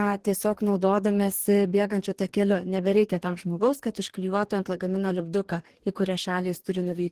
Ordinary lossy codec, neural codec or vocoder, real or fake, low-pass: Opus, 16 kbps; codec, 44.1 kHz, 2.6 kbps, DAC; fake; 14.4 kHz